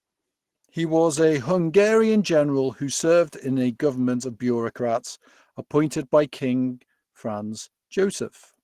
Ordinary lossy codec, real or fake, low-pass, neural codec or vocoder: Opus, 16 kbps; real; 14.4 kHz; none